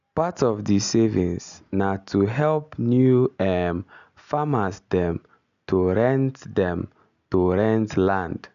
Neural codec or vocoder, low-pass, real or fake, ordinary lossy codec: none; 7.2 kHz; real; none